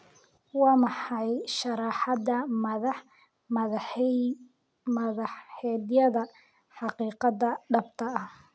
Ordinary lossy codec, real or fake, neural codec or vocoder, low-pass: none; real; none; none